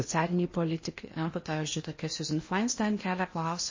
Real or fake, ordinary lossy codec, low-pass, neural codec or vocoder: fake; MP3, 32 kbps; 7.2 kHz; codec, 16 kHz in and 24 kHz out, 0.8 kbps, FocalCodec, streaming, 65536 codes